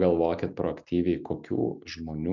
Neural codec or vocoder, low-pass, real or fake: none; 7.2 kHz; real